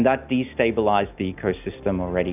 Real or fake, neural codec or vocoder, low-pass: real; none; 3.6 kHz